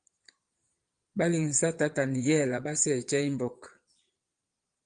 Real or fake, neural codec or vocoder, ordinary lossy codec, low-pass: fake; vocoder, 22.05 kHz, 80 mel bands, Vocos; Opus, 32 kbps; 9.9 kHz